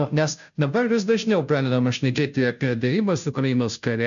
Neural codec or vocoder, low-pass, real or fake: codec, 16 kHz, 0.5 kbps, FunCodec, trained on Chinese and English, 25 frames a second; 7.2 kHz; fake